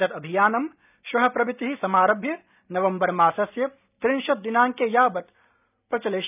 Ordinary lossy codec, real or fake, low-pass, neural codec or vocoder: none; real; 3.6 kHz; none